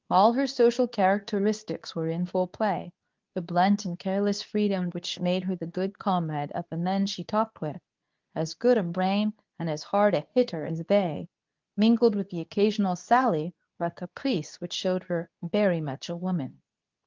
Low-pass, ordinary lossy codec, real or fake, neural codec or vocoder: 7.2 kHz; Opus, 32 kbps; fake; codec, 24 kHz, 0.9 kbps, WavTokenizer, medium speech release version 2